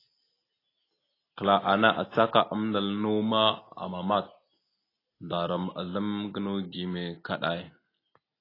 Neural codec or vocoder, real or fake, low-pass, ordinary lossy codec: none; real; 5.4 kHz; AAC, 24 kbps